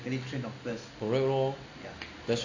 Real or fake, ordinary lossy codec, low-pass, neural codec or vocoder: real; AAC, 48 kbps; 7.2 kHz; none